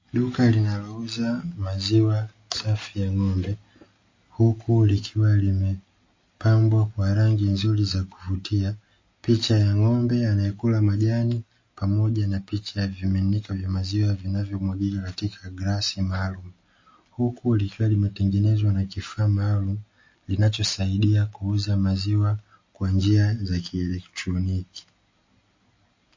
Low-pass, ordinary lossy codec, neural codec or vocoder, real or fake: 7.2 kHz; MP3, 32 kbps; none; real